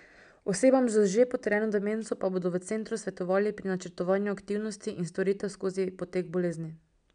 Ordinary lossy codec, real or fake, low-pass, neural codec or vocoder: none; real; 9.9 kHz; none